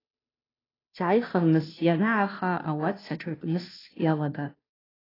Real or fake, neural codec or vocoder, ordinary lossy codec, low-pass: fake; codec, 16 kHz, 0.5 kbps, FunCodec, trained on Chinese and English, 25 frames a second; AAC, 24 kbps; 5.4 kHz